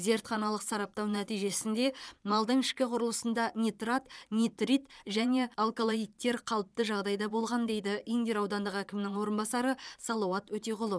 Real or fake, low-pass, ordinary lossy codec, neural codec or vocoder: fake; none; none; vocoder, 22.05 kHz, 80 mel bands, Vocos